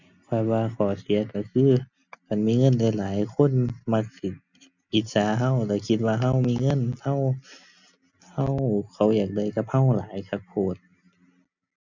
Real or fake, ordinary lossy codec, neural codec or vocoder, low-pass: real; none; none; 7.2 kHz